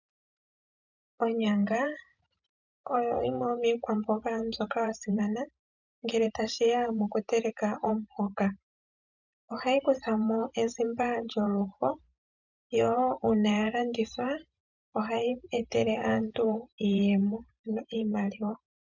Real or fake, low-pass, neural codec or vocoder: fake; 7.2 kHz; vocoder, 44.1 kHz, 128 mel bands every 512 samples, BigVGAN v2